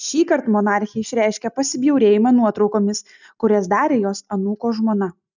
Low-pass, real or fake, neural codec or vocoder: 7.2 kHz; real; none